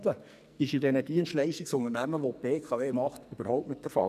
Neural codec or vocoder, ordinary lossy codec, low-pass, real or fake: codec, 44.1 kHz, 2.6 kbps, SNAC; none; 14.4 kHz; fake